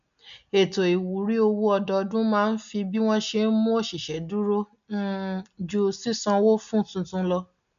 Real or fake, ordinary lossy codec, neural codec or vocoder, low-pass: real; AAC, 96 kbps; none; 7.2 kHz